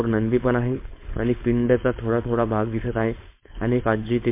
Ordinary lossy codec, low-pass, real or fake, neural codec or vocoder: MP3, 24 kbps; 3.6 kHz; fake; codec, 16 kHz, 4.8 kbps, FACodec